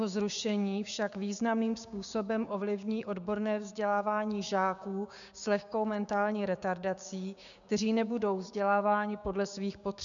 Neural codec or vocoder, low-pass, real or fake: codec, 16 kHz, 6 kbps, DAC; 7.2 kHz; fake